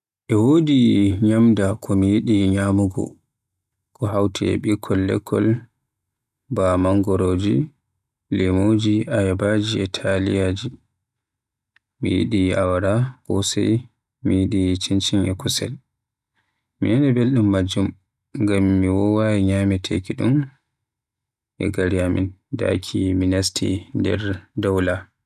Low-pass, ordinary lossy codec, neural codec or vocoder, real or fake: 14.4 kHz; none; none; real